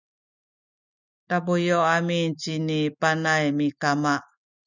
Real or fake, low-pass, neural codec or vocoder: real; 7.2 kHz; none